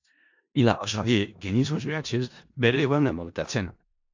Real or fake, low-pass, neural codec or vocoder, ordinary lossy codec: fake; 7.2 kHz; codec, 16 kHz in and 24 kHz out, 0.4 kbps, LongCat-Audio-Codec, four codebook decoder; AAC, 48 kbps